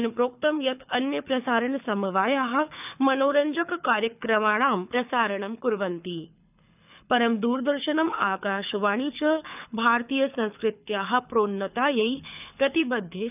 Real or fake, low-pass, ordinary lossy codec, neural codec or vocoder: fake; 3.6 kHz; none; codec, 24 kHz, 6 kbps, HILCodec